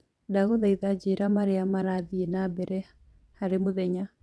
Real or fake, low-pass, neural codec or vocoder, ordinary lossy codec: fake; none; vocoder, 22.05 kHz, 80 mel bands, WaveNeXt; none